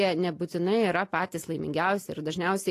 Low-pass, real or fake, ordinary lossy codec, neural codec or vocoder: 14.4 kHz; real; AAC, 48 kbps; none